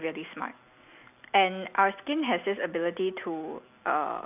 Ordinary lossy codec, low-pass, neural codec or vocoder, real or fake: none; 3.6 kHz; none; real